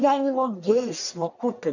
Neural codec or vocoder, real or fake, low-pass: codec, 44.1 kHz, 1.7 kbps, Pupu-Codec; fake; 7.2 kHz